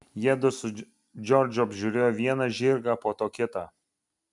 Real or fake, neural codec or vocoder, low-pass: real; none; 10.8 kHz